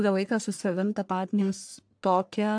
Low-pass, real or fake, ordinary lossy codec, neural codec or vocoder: 9.9 kHz; fake; AAC, 64 kbps; codec, 44.1 kHz, 1.7 kbps, Pupu-Codec